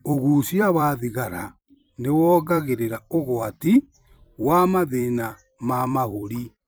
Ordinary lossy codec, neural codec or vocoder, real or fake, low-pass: none; vocoder, 44.1 kHz, 128 mel bands every 256 samples, BigVGAN v2; fake; none